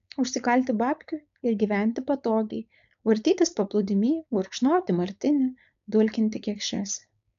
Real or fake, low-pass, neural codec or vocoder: fake; 7.2 kHz; codec, 16 kHz, 4.8 kbps, FACodec